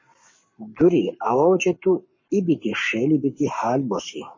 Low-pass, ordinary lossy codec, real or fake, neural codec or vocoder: 7.2 kHz; MP3, 32 kbps; fake; codec, 44.1 kHz, 7.8 kbps, Pupu-Codec